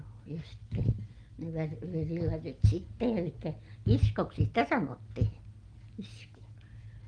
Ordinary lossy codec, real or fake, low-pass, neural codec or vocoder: Opus, 24 kbps; real; 9.9 kHz; none